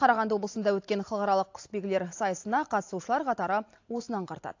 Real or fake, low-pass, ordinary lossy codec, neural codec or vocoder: real; 7.2 kHz; AAC, 48 kbps; none